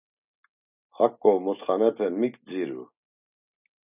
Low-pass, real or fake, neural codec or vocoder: 3.6 kHz; real; none